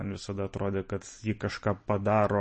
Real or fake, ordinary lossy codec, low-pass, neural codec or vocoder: real; MP3, 32 kbps; 10.8 kHz; none